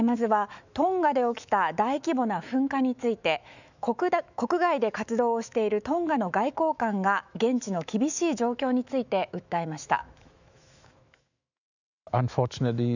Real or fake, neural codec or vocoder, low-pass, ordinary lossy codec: fake; autoencoder, 48 kHz, 128 numbers a frame, DAC-VAE, trained on Japanese speech; 7.2 kHz; none